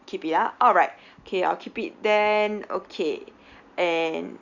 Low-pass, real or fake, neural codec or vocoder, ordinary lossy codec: 7.2 kHz; real; none; none